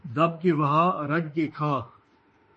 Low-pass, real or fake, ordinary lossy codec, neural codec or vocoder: 10.8 kHz; fake; MP3, 32 kbps; autoencoder, 48 kHz, 32 numbers a frame, DAC-VAE, trained on Japanese speech